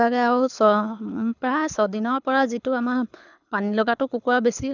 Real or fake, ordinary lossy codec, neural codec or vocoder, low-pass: fake; none; codec, 24 kHz, 6 kbps, HILCodec; 7.2 kHz